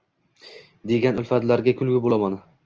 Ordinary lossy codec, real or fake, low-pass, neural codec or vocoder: Opus, 24 kbps; real; 7.2 kHz; none